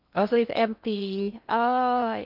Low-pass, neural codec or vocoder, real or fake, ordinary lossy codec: 5.4 kHz; codec, 16 kHz in and 24 kHz out, 0.6 kbps, FocalCodec, streaming, 2048 codes; fake; none